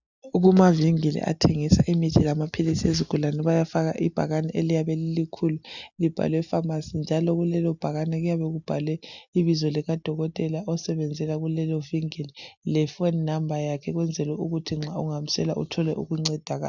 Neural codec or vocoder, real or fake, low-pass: none; real; 7.2 kHz